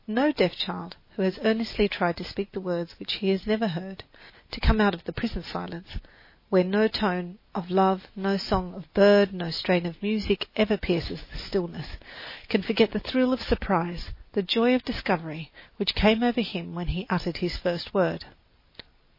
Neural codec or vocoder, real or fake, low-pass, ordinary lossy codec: none; real; 5.4 kHz; MP3, 24 kbps